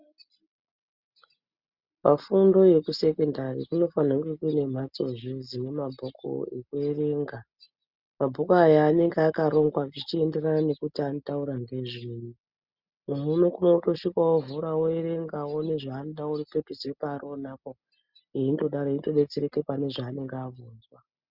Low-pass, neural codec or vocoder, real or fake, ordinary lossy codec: 5.4 kHz; none; real; Opus, 64 kbps